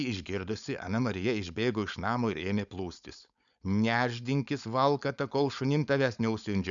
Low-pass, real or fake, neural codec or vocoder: 7.2 kHz; fake; codec, 16 kHz, 4.8 kbps, FACodec